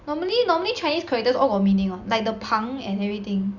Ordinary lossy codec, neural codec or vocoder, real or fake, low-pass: Opus, 64 kbps; none; real; 7.2 kHz